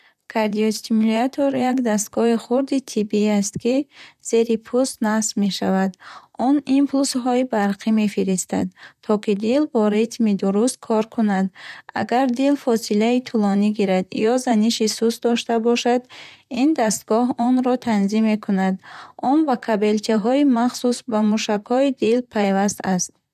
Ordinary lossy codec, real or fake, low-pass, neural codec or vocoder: none; fake; 14.4 kHz; vocoder, 44.1 kHz, 128 mel bands, Pupu-Vocoder